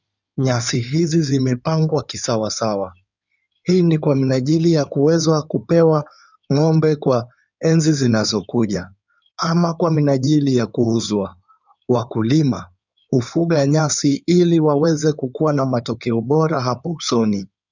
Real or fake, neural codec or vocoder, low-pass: fake; codec, 16 kHz in and 24 kHz out, 2.2 kbps, FireRedTTS-2 codec; 7.2 kHz